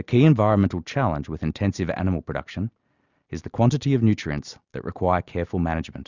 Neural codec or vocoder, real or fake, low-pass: none; real; 7.2 kHz